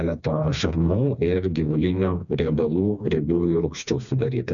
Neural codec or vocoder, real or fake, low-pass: codec, 16 kHz, 2 kbps, FreqCodec, smaller model; fake; 7.2 kHz